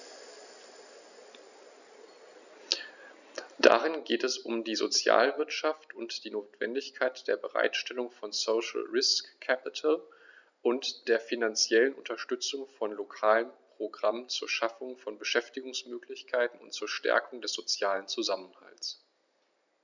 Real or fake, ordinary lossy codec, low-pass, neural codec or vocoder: real; none; 7.2 kHz; none